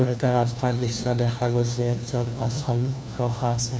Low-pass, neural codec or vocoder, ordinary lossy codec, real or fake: none; codec, 16 kHz, 1 kbps, FunCodec, trained on LibriTTS, 50 frames a second; none; fake